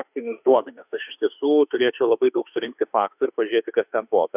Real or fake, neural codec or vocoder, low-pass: fake; autoencoder, 48 kHz, 32 numbers a frame, DAC-VAE, trained on Japanese speech; 3.6 kHz